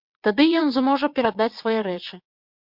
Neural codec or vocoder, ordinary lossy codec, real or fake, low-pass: vocoder, 22.05 kHz, 80 mel bands, WaveNeXt; MP3, 48 kbps; fake; 5.4 kHz